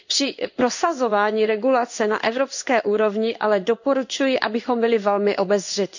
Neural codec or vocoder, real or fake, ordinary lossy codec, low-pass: codec, 16 kHz in and 24 kHz out, 1 kbps, XY-Tokenizer; fake; none; 7.2 kHz